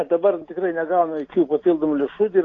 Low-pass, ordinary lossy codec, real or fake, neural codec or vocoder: 7.2 kHz; AAC, 32 kbps; real; none